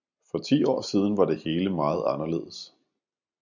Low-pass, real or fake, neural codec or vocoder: 7.2 kHz; real; none